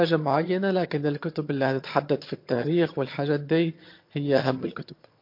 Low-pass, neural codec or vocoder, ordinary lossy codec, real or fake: 5.4 kHz; vocoder, 22.05 kHz, 80 mel bands, HiFi-GAN; MP3, 32 kbps; fake